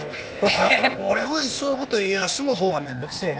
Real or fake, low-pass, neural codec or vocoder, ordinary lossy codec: fake; none; codec, 16 kHz, 0.8 kbps, ZipCodec; none